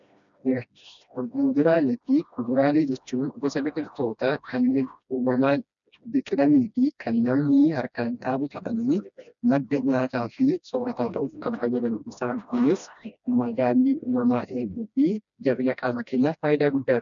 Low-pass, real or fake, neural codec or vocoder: 7.2 kHz; fake; codec, 16 kHz, 1 kbps, FreqCodec, smaller model